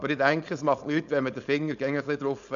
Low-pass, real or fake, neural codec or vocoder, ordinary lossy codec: 7.2 kHz; fake; codec, 16 kHz, 4.8 kbps, FACodec; MP3, 96 kbps